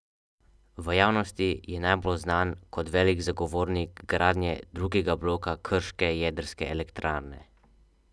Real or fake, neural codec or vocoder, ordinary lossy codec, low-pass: real; none; none; none